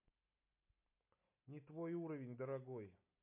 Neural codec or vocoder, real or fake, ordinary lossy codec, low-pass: none; real; MP3, 24 kbps; 3.6 kHz